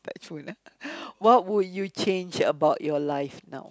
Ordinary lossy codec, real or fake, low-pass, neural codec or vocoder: none; real; none; none